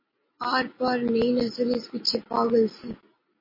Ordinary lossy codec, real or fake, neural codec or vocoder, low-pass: MP3, 24 kbps; real; none; 5.4 kHz